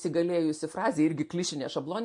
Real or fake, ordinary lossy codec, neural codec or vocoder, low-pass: real; MP3, 48 kbps; none; 10.8 kHz